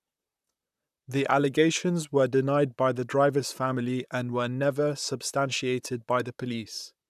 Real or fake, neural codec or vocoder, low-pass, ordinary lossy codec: fake; vocoder, 44.1 kHz, 128 mel bands, Pupu-Vocoder; 14.4 kHz; none